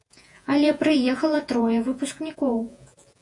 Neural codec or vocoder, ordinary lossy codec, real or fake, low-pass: vocoder, 48 kHz, 128 mel bands, Vocos; AAC, 64 kbps; fake; 10.8 kHz